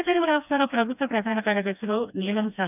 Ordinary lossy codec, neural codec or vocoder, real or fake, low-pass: none; codec, 16 kHz, 1 kbps, FreqCodec, smaller model; fake; 3.6 kHz